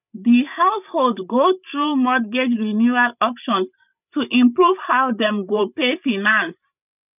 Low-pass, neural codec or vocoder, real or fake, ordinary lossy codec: 3.6 kHz; codec, 16 kHz, 8 kbps, FreqCodec, larger model; fake; none